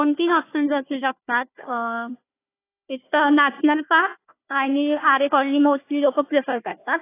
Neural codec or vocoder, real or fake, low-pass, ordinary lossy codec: codec, 16 kHz, 1 kbps, FunCodec, trained on Chinese and English, 50 frames a second; fake; 3.6 kHz; AAC, 24 kbps